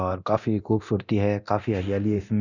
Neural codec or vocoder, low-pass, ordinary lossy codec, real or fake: codec, 24 kHz, 0.9 kbps, DualCodec; 7.2 kHz; none; fake